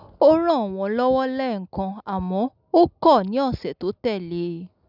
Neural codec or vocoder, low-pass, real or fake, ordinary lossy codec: none; 5.4 kHz; real; none